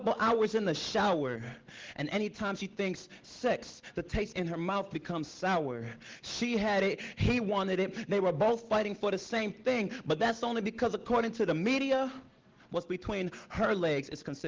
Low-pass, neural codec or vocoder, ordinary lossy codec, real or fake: 7.2 kHz; none; Opus, 16 kbps; real